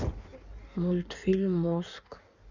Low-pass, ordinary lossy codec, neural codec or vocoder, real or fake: 7.2 kHz; none; codec, 16 kHz in and 24 kHz out, 1.1 kbps, FireRedTTS-2 codec; fake